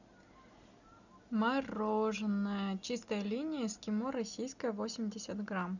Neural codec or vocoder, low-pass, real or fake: none; 7.2 kHz; real